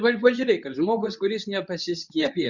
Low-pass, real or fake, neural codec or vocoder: 7.2 kHz; fake; codec, 24 kHz, 0.9 kbps, WavTokenizer, medium speech release version 2